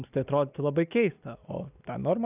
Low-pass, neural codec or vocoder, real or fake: 3.6 kHz; none; real